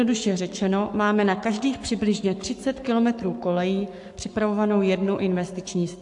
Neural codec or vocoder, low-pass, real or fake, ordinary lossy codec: codec, 44.1 kHz, 7.8 kbps, Pupu-Codec; 10.8 kHz; fake; AAC, 64 kbps